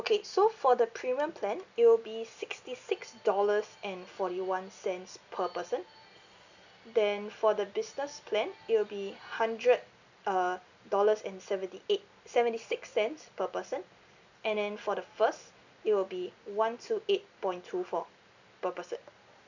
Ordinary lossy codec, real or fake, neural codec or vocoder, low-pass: none; real; none; 7.2 kHz